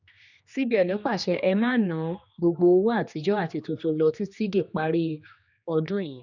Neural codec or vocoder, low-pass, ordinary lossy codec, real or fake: codec, 16 kHz, 2 kbps, X-Codec, HuBERT features, trained on general audio; 7.2 kHz; none; fake